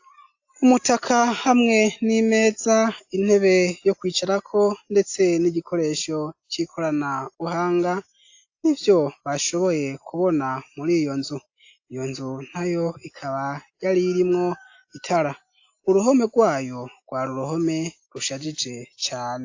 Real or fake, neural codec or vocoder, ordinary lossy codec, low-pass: real; none; AAC, 48 kbps; 7.2 kHz